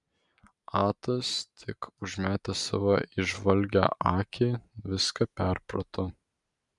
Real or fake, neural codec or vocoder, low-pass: real; none; 10.8 kHz